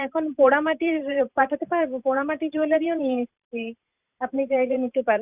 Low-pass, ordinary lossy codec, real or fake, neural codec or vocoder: 3.6 kHz; Opus, 64 kbps; fake; vocoder, 44.1 kHz, 128 mel bands every 512 samples, BigVGAN v2